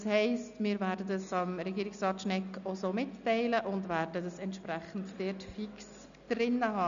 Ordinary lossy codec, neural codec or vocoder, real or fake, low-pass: none; none; real; 7.2 kHz